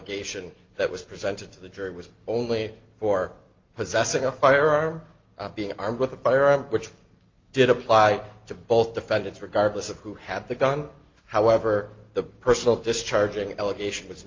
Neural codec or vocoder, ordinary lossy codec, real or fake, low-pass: none; Opus, 32 kbps; real; 7.2 kHz